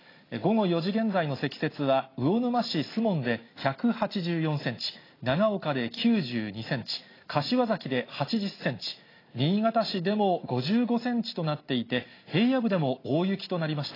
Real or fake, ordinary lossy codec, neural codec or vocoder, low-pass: real; AAC, 24 kbps; none; 5.4 kHz